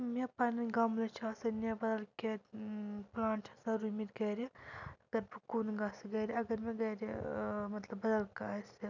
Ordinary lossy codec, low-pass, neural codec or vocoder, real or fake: none; 7.2 kHz; none; real